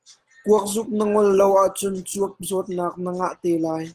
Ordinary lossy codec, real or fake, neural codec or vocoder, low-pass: Opus, 16 kbps; real; none; 14.4 kHz